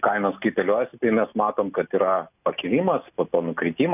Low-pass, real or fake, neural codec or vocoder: 3.6 kHz; real; none